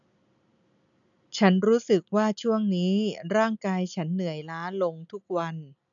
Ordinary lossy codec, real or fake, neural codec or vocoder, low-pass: none; real; none; 7.2 kHz